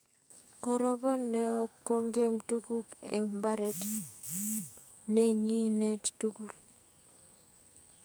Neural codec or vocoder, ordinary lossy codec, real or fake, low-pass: codec, 44.1 kHz, 2.6 kbps, SNAC; none; fake; none